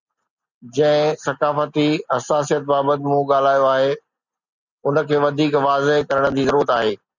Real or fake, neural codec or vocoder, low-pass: real; none; 7.2 kHz